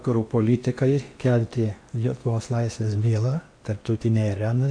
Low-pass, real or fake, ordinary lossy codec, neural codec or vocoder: 9.9 kHz; fake; AAC, 64 kbps; codec, 16 kHz in and 24 kHz out, 0.8 kbps, FocalCodec, streaming, 65536 codes